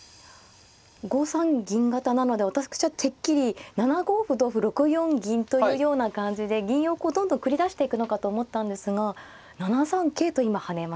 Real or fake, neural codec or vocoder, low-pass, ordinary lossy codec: real; none; none; none